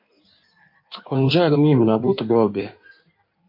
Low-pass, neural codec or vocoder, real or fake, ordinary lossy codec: 5.4 kHz; codec, 16 kHz in and 24 kHz out, 1.1 kbps, FireRedTTS-2 codec; fake; MP3, 32 kbps